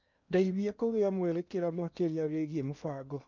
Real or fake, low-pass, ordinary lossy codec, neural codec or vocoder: fake; 7.2 kHz; none; codec, 16 kHz in and 24 kHz out, 0.8 kbps, FocalCodec, streaming, 65536 codes